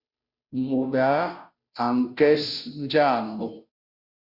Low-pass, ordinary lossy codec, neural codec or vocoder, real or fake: 5.4 kHz; Opus, 64 kbps; codec, 16 kHz, 0.5 kbps, FunCodec, trained on Chinese and English, 25 frames a second; fake